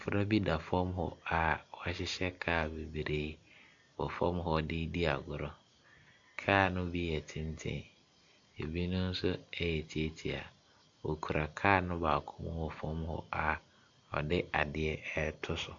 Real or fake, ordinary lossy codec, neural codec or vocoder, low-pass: real; AAC, 64 kbps; none; 7.2 kHz